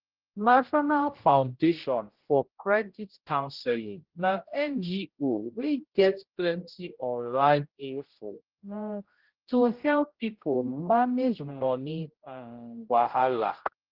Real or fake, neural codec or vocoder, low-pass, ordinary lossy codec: fake; codec, 16 kHz, 0.5 kbps, X-Codec, HuBERT features, trained on general audio; 5.4 kHz; Opus, 16 kbps